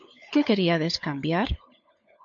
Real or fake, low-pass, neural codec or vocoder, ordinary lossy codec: fake; 7.2 kHz; codec, 16 kHz, 16 kbps, FunCodec, trained on LibriTTS, 50 frames a second; MP3, 48 kbps